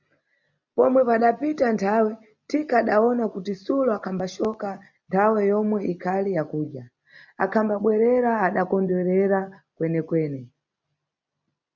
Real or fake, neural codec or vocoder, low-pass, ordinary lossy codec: real; none; 7.2 kHz; MP3, 64 kbps